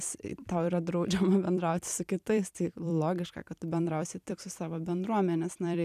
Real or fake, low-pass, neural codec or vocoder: fake; 14.4 kHz; vocoder, 44.1 kHz, 128 mel bands every 256 samples, BigVGAN v2